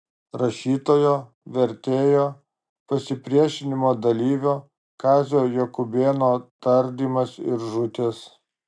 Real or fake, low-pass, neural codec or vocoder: real; 9.9 kHz; none